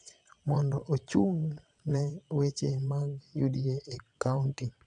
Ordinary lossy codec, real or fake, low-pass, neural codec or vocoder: none; fake; 9.9 kHz; vocoder, 22.05 kHz, 80 mel bands, WaveNeXt